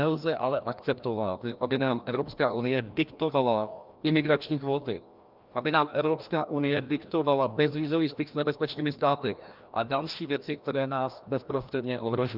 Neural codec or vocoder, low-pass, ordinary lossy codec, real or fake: codec, 16 kHz, 1 kbps, FreqCodec, larger model; 5.4 kHz; Opus, 24 kbps; fake